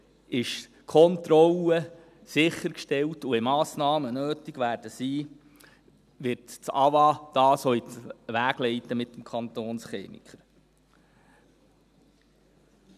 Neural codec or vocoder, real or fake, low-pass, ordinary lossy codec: none; real; 14.4 kHz; none